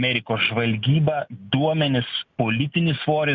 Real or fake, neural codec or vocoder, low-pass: real; none; 7.2 kHz